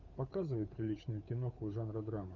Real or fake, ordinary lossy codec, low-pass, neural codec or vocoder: fake; Opus, 64 kbps; 7.2 kHz; codec, 16 kHz, 8 kbps, FunCodec, trained on Chinese and English, 25 frames a second